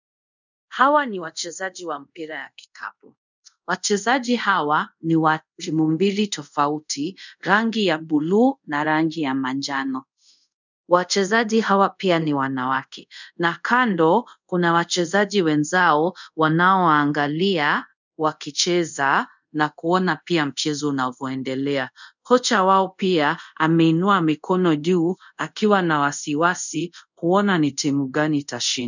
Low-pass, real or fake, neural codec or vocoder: 7.2 kHz; fake; codec, 24 kHz, 0.5 kbps, DualCodec